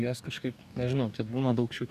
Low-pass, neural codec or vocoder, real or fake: 14.4 kHz; codec, 44.1 kHz, 2.6 kbps, DAC; fake